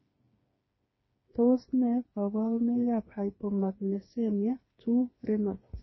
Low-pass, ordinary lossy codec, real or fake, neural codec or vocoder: 7.2 kHz; MP3, 24 kbps; fake; codec, 16 kHz, 4 kbps, FreqCodec, smaller model